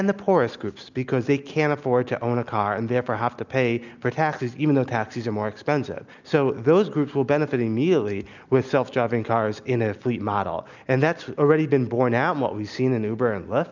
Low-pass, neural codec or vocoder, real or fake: 7.2 kHz; none; real